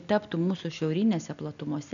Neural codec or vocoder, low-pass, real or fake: none; 7.2 kHz; real